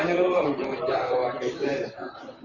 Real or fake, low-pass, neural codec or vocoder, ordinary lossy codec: fake; 7.2 kHz; vocoder, 44.1 kHz, 128 mel bands, Pupu-Vocoder; Opus, 64 kbps